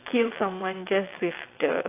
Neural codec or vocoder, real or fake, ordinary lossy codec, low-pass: vocoder, 22.05 kHz, 80 mel bands, WaveNeXt; fake; AAC, 24 kbps; 3.6 kHz